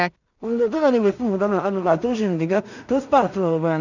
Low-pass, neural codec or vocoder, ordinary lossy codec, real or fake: 7.2 kHz; codec, 16 kHz in and 24 kHz out, 0.4 kbps, LongCat-Audio-Codec, two codebook decoder; none; fake